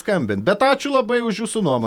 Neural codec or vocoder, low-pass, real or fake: vocoder, 48 kHz, 128 mel bands, Vocos; 19.8 kHz; fake